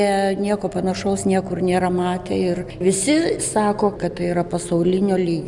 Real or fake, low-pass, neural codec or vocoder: real; 10.8 kHz; none